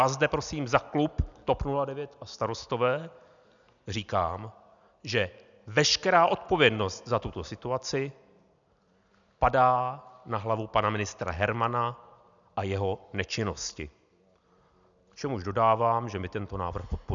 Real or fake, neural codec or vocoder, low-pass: real; none; 7.2 kHz